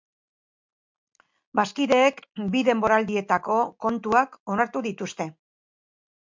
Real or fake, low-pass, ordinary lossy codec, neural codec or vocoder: real; 7.2 kHz; MP3, 64 kbps; none